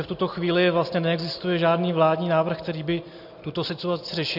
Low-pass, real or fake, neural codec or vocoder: 5.4 kHz; real; none